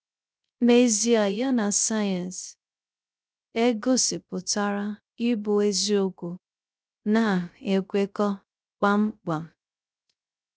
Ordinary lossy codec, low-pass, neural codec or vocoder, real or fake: none; none; codec, 16 kHz, 0.3 kbps, FocalCodec; fake